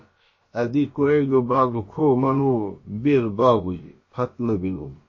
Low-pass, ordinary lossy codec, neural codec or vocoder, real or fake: 7.2 kHz; MP3, 32 kbps; codec, 16 kHz, about 1 kbps, DyCAST, with the encoder's durations; fake